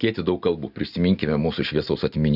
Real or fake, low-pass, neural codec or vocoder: real; 5.4 kHz; none